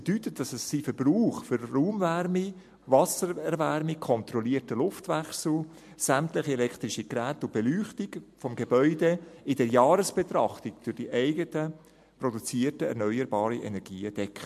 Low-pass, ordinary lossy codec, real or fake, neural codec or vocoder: 14.4 kHz; MP3, 64 kbps; real; none